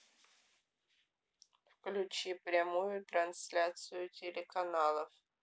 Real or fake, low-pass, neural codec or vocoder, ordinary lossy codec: real; none; none; none